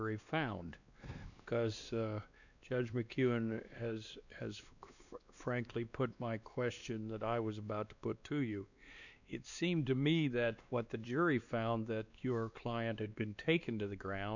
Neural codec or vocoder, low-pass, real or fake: codec, 16 kHz, 2 kbps, X-Codec, WavLM features, trained on Multilingual LibriSpeech; 7.2 kHz; fake